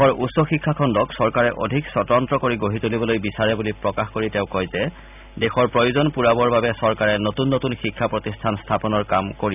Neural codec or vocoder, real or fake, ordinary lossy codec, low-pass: none; real; none; 3.6 kHz